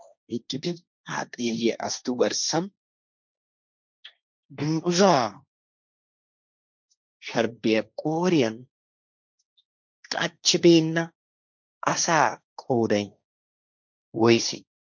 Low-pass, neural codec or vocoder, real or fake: 7.2 kHz; codec, 16 kHz, 1.1 kbps, Voila-Tokenizer; fake